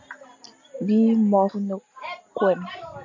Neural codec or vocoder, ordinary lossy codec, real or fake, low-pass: none; MP3, 64 kbps; real; 7.2 kHz